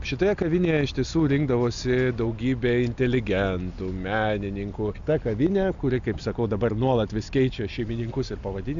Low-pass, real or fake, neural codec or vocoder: 7.2 kHz; real; none